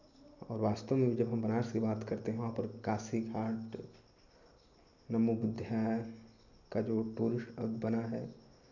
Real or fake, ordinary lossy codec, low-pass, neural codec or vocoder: real; none; 7.2 kHz; none